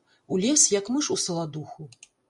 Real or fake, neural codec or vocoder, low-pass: real; none; 10.8 kHz